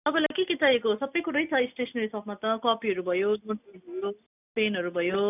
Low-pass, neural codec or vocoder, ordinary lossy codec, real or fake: 3.6 kHz; none; none; real